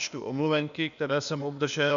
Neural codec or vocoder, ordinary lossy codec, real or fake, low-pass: codec, 16 kHz, 0.8 kbps, ZipCodec; MP3, 96 kbps; fake; 7.2 kHz